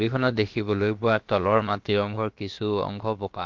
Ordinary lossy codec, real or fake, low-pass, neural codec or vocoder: Opus, 32 kbps; fake; 7.2 kHz; codec, 16 kHz, about 1 kbps, DyCAST, with the encoder's durations